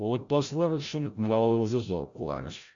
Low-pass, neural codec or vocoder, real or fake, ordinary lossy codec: 7.2 kHz; codec, 16 kHz, 0.5 kbps, FreqCodec, larger model; fake; Opus, 64 kbps